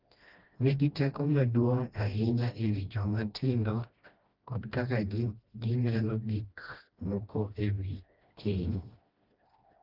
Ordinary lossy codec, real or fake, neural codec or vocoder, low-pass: Opus, 32 kbps; fake; codec, 16 kHz, 1 kbps, FreqCodec, smaller model; 5.4 kHz